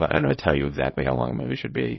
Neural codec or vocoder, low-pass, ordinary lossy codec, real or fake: codec, 24 kHz, 0.9 kbps, WavTokenizer, small release; 7.2 kHz; MP3, 24 kbps; fake